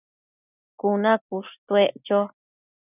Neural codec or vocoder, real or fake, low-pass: none; real; 3.6 kHz